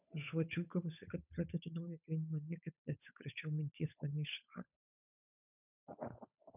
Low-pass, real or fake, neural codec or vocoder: 3.6 kHz; fake; codec, 16 kHz, 8 kbps, FunCodec, trained on Chinese and English, 25 frames a second